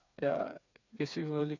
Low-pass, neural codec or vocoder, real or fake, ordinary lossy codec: 7.2 kHz; codec, 16 kHz, 4 kbps, FreqCodec, smaller model; fake; none